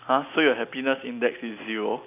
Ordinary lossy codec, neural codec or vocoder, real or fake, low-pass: none; none; real; 3.6 kHz